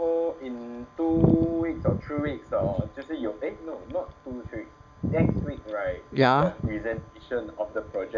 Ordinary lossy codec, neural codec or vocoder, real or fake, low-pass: none; none; real; 7.2 kHz